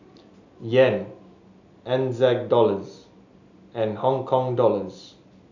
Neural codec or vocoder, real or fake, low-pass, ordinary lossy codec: none; real; 7.2 kHz; none